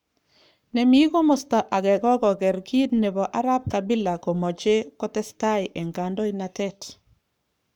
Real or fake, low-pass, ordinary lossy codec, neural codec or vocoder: fake; 19.8 kHz; none; codec, 44.1 kHz, 7.8 kbps, Pupu-Codec